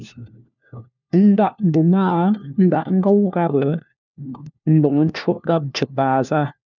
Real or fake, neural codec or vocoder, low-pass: fake; codec, 16 kHz, 1 kbps, FunCodec, trained on LibriTTS, 50 frames a second; 7.2 kHz